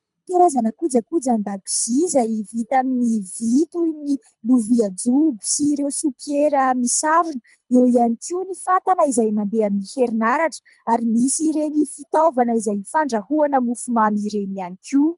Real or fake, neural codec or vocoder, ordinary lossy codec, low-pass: fake; codec, 24 kHz, 3 kbps, HILCodec; MP3, 96 kbps; 10.8 kHz